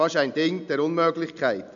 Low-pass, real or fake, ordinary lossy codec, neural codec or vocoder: 7.2 kHz; real; none; none